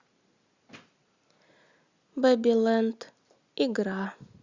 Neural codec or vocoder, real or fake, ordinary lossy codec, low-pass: none; real; Opus, 64 kbps; 7.2 kHz